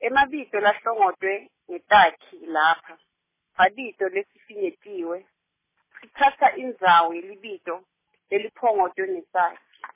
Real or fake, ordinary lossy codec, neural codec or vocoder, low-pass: real; MP3, 16 kbps; none; 3.6 kHz